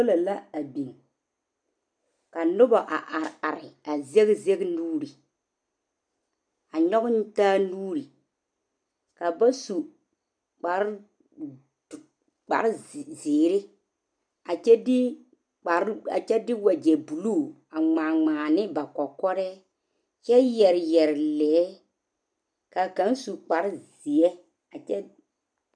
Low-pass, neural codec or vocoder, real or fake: 9.9 kHz; none; real